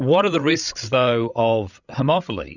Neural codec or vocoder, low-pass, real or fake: codec, 16 kHz, 16 kbps, FreqCodec, larger model; 7.2 kHz; fake